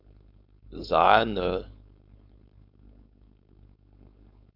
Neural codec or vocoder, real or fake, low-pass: codec, 16 kHz, 4.8 kbps, FACodec; fake; 5.4 kHz